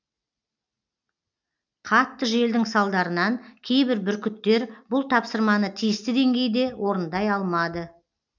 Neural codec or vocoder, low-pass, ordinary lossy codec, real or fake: none; 7.2 kHz; none; real